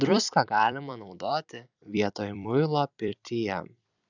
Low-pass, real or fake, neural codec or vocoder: 7.2 kHz; fake; vocoder, 44.1 kHz, 128 mel bands every 256 samples, BigVGAN v2